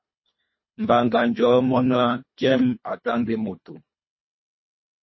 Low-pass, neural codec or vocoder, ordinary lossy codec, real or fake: 7.2 kHz; codec, 24 kHz, 1.5 kbps, HILCodec; MP3, 24 kbps; fake